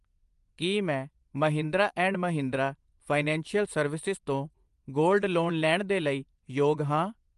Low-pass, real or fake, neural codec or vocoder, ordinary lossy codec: 10.8 kHz; fake; vocoder, 24 kHz, 100 mel bands, Vocos; Opus, 32 kbps